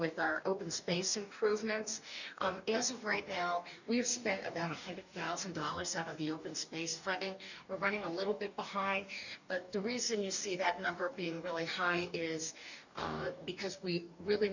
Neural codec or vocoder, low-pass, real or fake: codec, 44.1 kHz, 2.6 kbps, DAC; 7.2 kHz; fake